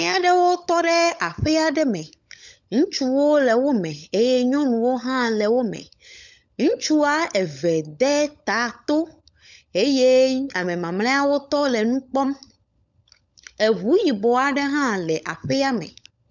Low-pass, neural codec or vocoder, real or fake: 7.2 kHz; codec, 16 kHz, 16 kbps, FunCodec, trained on LibriTTS, 50 frames a second; fake